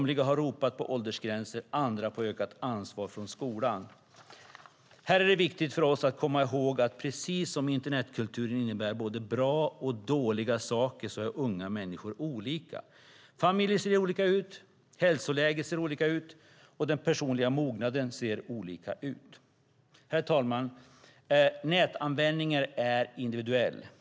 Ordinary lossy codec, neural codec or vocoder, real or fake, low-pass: none; none; real; none